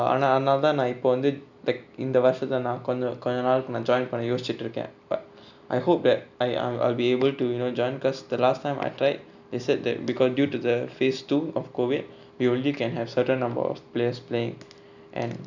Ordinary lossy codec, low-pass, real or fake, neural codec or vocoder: none; 7.2 kHz; real; none